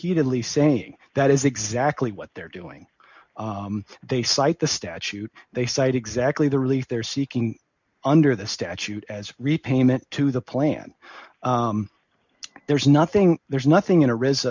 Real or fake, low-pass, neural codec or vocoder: real; 7.2 kHz; none